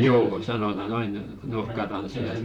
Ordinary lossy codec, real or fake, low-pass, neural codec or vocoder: none; fake; 19.8 kHz; vocoder, 44.1 kHz, 128 mel bands, Pupu-Vocoder